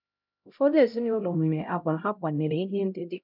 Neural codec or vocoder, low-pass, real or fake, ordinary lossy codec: codec, 16 kHz, 0.5 kbps, X-Codec, HuBERT features, trained on LibriSpeech; 5.4 kHz; fake; none